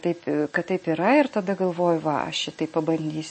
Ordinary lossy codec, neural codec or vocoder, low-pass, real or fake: MP3, 32 kbps; none; 9.9 kHz; real